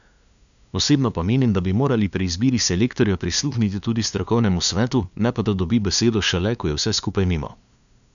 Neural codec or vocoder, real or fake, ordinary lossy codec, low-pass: codec, 16 kHz, 2 kbps, FunCodec, trained on LibriTTS, 25 frames a second; fake; none; 7.2 kHz